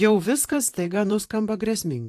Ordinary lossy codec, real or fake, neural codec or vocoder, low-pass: AAC, 64 kbps; fake; vocoder, 44.1 kHz, 128 mel bands, Pupu-Vocoder; 14.4 kHz